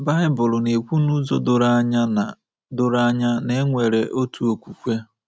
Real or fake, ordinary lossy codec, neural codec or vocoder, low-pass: real; none; none; none